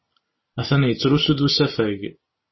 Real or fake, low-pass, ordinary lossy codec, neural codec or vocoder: real; 7.2 kHz; MP3, 24 kbps; none